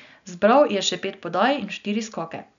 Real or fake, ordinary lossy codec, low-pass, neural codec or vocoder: real; none; 7.2 kHz; none